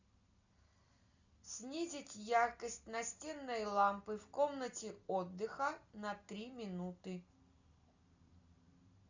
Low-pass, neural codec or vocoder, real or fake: 7.2 kHz; none; real